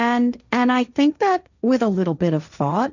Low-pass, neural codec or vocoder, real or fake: 7.2 kHz; codec, 16 kHz, 1.1 kbps, Voila-Tokenizer; fake